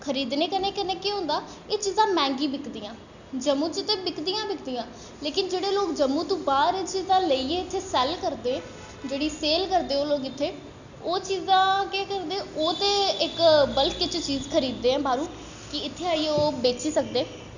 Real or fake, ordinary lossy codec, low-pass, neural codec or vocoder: real; none; 7.2 kHz; none